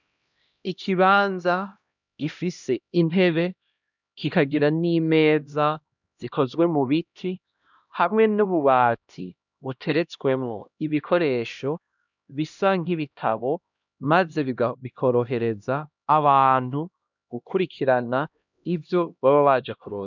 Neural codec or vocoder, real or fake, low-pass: codec, 16 kHz, 1 kbps, X-Codec, HuBERT features, trained on LibriSpeech; fake; 7.2 kHz